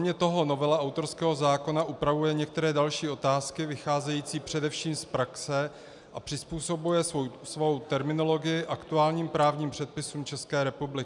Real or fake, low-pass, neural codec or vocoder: real; 10.8 kHz; none